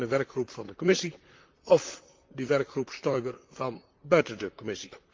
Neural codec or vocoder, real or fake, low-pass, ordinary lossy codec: vocoder, 44.1 kHz, 128 mel bands, Pupu-Vocoder; fake; 7.2 kHz; Opus, 32 kbps